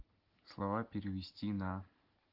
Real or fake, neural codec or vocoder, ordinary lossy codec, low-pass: real; none; Opus, 16 kbps; 5.4 kHz